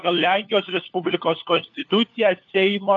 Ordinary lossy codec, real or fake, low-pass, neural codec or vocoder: AAC, 48 kbps; fake; 7.2 kHz; codec, 16 kHz, 4 kbps, FunCodec, trained on LibriTTS, 50 frames a second